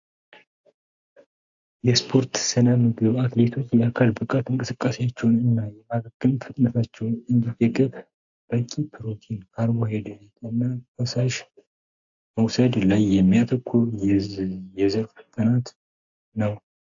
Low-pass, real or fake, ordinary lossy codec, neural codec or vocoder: 7.2 kHz; real; AAC, 64 kbps; none